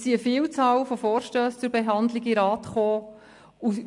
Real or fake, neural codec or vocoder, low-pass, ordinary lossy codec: real; none; 10.8 kHz; MP3, 64 kbps